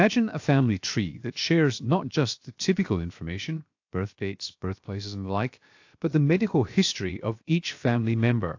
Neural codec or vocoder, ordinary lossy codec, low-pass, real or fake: codec, 16 kHz, 0.7 kbps, FocalCodec; AAC, 48 kbps; 7.2 kHz; fake